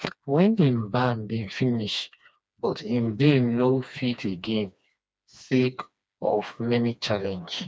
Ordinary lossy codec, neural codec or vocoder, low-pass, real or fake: none; codec, 16 kHz, 2 kbps, FreqCodec, smaller model; none; fake